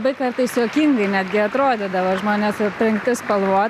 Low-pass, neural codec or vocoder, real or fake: 14.4 kHz; none; real